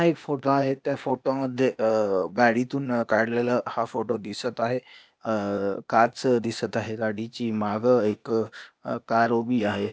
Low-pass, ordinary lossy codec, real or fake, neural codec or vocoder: none; none; fake; codec, 16 kHz, 0.8 kbps, ZipCodec